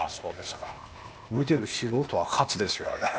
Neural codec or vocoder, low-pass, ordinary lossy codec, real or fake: codec, 16 kHz, 0.8 kbps, ZipCodec; none; none; fake